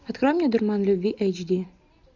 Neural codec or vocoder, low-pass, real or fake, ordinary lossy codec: none; 7.2 kHz; real; MP3, 64 kbps